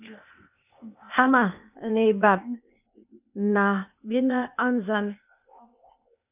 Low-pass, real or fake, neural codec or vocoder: 3.6 kHz; fake; codec, 16 kHz, 0.8 kbps, ZipCodec